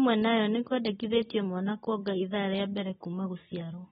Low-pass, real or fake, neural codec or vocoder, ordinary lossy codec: 19.8 kHz; real; none; AAC, 16 kbps